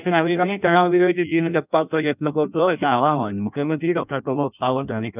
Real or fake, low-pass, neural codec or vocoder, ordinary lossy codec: fake; 3.6 kHz; codec, 16 kHz in and 24 kHz out, 0.6 kbps, FireRedTTS-2 codec; none